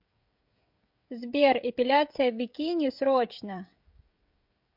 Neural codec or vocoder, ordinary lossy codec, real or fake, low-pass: codec, 16 kHz, 16 kbps, FreqCodec, smaller model; MP3, 48 kbps; fake; 5.4 kHz